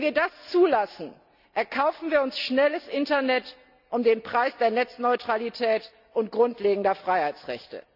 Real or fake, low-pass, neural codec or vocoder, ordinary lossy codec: real; 5.4 kHz; none; none